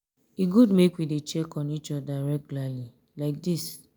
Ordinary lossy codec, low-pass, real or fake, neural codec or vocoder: none; none; real; none